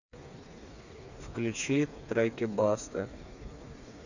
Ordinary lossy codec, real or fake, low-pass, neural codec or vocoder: none; fake; 7.2 kHz; codec, 16 kHz, 4 kbps, FreqCodec, smaller model